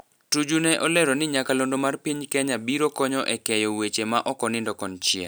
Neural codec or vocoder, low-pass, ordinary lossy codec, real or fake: none; none; none; real